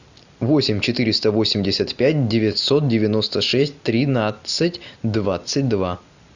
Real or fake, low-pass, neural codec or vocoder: real; 7.2 kHz; none